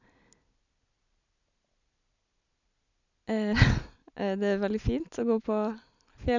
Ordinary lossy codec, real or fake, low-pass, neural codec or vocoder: none; real; 7.2 kHz; none